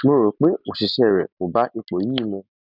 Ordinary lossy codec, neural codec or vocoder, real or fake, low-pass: none; none; real; 5.4 kHz